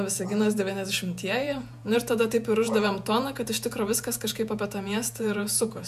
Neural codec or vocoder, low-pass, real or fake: vocoder, 48 kHz, 128 mel bands, Vocos; 14.4 kHz; fake